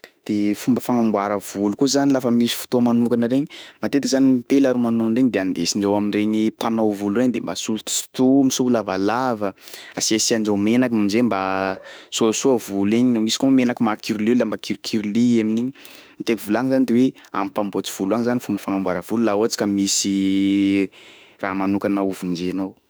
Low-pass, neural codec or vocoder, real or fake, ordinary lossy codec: none; autoencoder, 48 kHz, 32 numbers a frame, DAC-VAE, trained on Japanese speech; fake; none